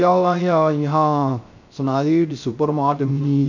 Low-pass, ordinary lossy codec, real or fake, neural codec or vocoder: 7.2 kHz; none; fake; codec, 16 kHz, 0.3 kbps, FocalCodec